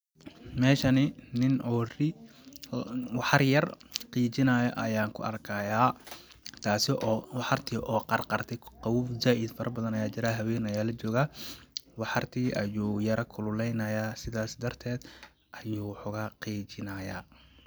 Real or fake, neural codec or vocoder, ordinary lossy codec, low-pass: real; none; none; none